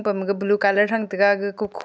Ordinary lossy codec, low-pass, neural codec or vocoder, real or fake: none; none; none; real